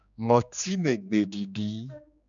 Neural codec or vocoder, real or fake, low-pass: codec, 16 kHz, 2 kbps, X-Codec, HuBERT features, trained on general audio; fake; 7.2 kHz